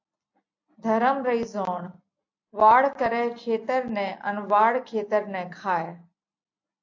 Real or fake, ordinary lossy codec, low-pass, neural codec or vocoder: real; AAC, 48 kbps; 7.2 kHz; none